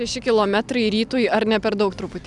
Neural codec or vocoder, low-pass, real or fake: none; 10.8 kHz; real